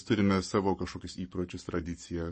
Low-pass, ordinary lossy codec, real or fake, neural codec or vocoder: 10.8 kHz; MP3, 32 kbps; fake; codec, 44.1 kHz, 7.8 kbps, Pupu-Codec